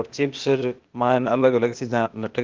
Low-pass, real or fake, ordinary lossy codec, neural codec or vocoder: 7.2 kHz; fake; Opus, 32 kbps; codec, 16 kHz, 0.8 kbps, ZipCodec